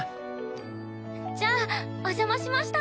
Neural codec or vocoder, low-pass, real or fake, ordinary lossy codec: none; none; real; none